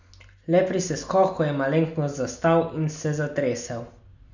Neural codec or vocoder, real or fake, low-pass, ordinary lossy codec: none; real; 7.2 kHz; none